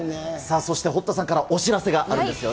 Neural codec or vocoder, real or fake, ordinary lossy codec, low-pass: none; real; none; none